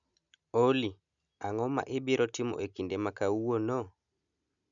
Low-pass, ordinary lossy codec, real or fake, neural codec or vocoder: 7.2 kHz; none; real; none